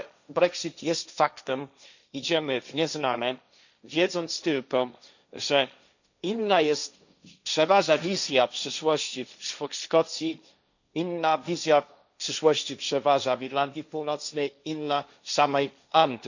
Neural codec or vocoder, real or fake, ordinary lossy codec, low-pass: codec, 16 kHz, 1.1 kbps, Voila-Tokenizer; fake; none; 7.2 kHz